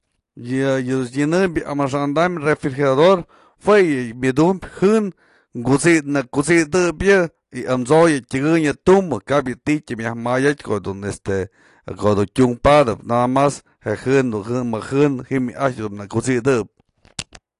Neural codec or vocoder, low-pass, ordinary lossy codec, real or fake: none; 10.8 kHz; AAC, 48 kbps; real